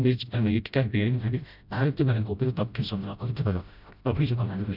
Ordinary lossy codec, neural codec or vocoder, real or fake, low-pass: none; codec, 16 kHz, 0.5 kbps, FreqCodec, smaller model; fake; 5.4 kHz